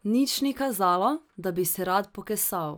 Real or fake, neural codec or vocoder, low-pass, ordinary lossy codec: real; none; none; none